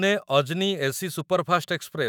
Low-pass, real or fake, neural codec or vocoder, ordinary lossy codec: none; fake; autoencoder, 48 kHz, 128 numbers a frame, DAC-VAE, trained on Japanese speech; none